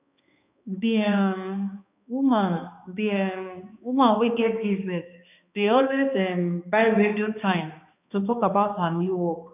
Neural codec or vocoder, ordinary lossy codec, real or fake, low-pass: codec, 16 kHz, 2 kbps, X-Codec, HuBERT features, trained on balanced general audio; none; fake; 3.6 kHz